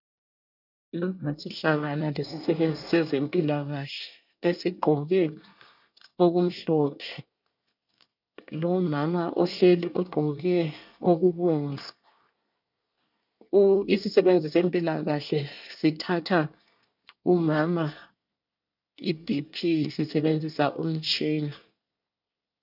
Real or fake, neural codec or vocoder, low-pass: fake; codec, 24 kHz, 1 kbps, SNAC; 5.4 kHz